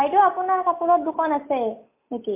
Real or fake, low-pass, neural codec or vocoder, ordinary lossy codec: real; 3.6 kHz; none; MP3, 24 kbps